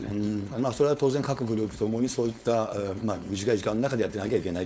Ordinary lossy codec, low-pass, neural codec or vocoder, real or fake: none; none; codec, 16 kHz, 4.8 kbps, FACodec; fake